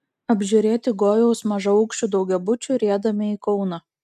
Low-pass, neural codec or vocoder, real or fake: 14.4 kHz; none; real